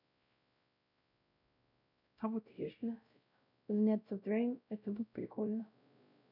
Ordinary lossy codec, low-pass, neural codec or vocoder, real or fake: none; 5.4 kHz; codec, 16 kHz, 0.5 kbps, X-Codec, WavLM features, trained on Multilingual LibriSpeech; fake